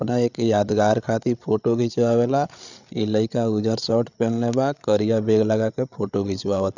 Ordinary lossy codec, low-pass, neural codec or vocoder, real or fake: none; 7.2 kHz; codec, 16 kHz, 8 kbps, FreqCodec, larger model; fake